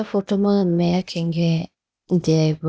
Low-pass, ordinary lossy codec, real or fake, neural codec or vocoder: none; none; fake; codec, 16 kHz, 0.8 kbps, ZipCodec